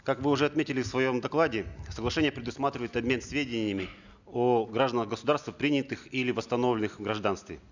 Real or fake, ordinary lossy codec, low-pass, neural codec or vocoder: real; none; 7.2 kHz; none